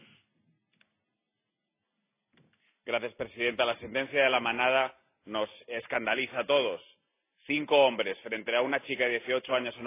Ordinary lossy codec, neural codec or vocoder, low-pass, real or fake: AAC, 24 kbps; none; 3.6 kHz; real